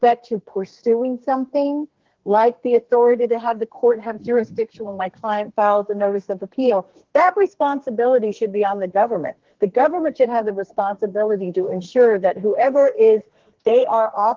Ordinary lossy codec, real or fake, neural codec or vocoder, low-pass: Opus, 16 kbps; fake; codec, 32 kHz, 1.9 kbps, SNAC; 7.2 kHz